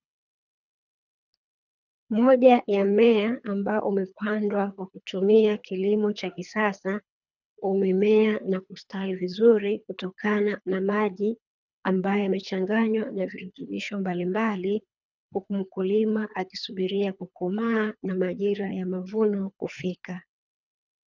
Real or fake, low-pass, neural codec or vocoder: fake; 7.2 kHz; codec, 24 kHz, 3 kbps, HILCodec